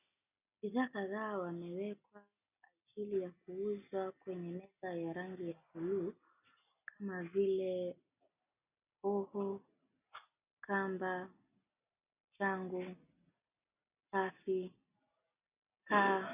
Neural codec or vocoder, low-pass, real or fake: none; 3.6 kHz; real